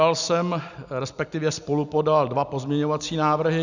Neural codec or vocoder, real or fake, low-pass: none; real; 7.2 kHz